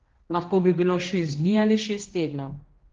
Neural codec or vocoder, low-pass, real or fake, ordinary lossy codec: codec, 16 kHz, 1 kbps, X-Codec, HuBERT features, trained on general audio; 7.2 kHz; fake; Opus, 16 kbps